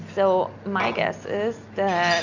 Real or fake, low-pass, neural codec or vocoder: real; 7.2 kHz; none